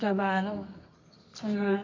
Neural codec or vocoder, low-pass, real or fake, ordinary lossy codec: codec, 24 kHz, 0.9 kbps, WavTokenizer, medium music audio release; 7.2 kHz; fake; MP3, 48 kbps